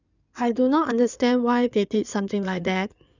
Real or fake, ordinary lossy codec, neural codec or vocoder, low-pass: fake; none; codec, 16 kHz in and 24 kHz out, 2.2 kbps, FireRedTTS-2 codec; 7.2 kHz